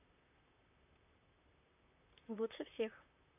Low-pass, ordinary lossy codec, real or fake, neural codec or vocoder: 3.6 kHz; none; fake; vocoder, 44.1 kHz, 128 mel bands, Pupu-Vocoder